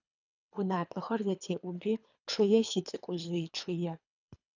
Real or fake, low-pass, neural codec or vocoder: fake; 7.2 kHz; codec, 24 kHz, 3 kbps, HILCodec